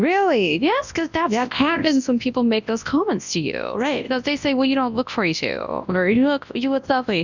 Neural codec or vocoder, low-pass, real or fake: codec, 24 kHz, 0.9 kbps, WavTokenizer, large speech release; 7.2 kHz; fake